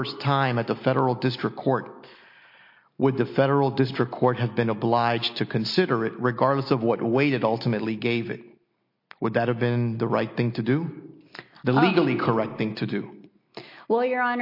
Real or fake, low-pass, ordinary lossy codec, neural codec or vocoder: real; 5.4 kHz; MP3, 32 kbps; none